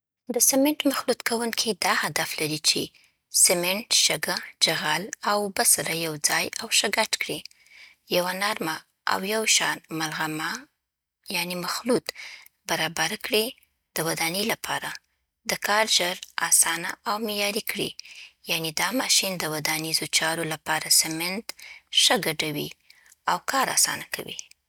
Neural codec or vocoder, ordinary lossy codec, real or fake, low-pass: none; none; real; none